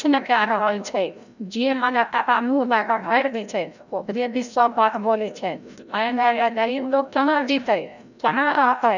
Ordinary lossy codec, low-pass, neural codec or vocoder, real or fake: none; 7.2 kHz; codec, 16 kHz, 0.5 kbps, FreqCodec, larger model; fake